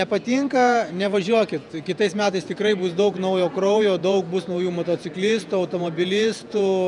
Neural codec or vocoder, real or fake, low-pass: vocoder, 48 kHz, 128 mel bands, Vocos; fake; 10.8 kHz